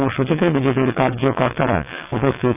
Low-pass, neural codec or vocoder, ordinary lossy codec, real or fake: 3.6 kHz; vocoder, 22.05 kHz, 80 mel bands, WaveNeXt; none; fake